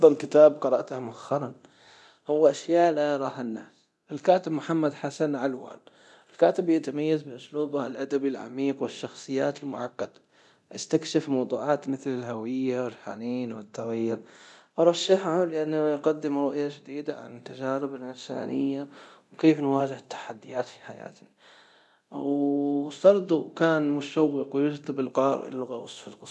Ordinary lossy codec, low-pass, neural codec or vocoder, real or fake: none; none; codec, 24 kHz, 0.9 kbps, DualCodec; fake